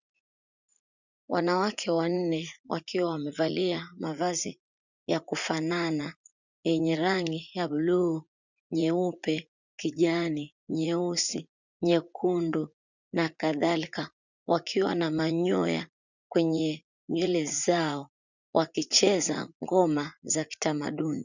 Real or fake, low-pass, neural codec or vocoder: fake; 7.2 kHz; vocoder, 44.1 kHz, 80 mel bands, Vocos